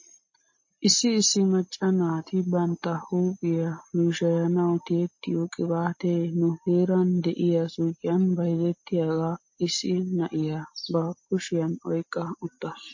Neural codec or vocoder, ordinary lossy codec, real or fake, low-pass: none; MP3, 32 kbps; real; 7.2 kHz